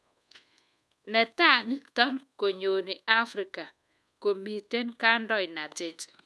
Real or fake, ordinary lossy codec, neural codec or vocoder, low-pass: fake; none; codec, 24 kHz, 1.2 kbps, DualCodec; none